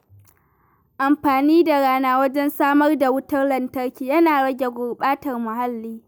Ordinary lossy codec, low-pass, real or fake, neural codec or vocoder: none; none; real; none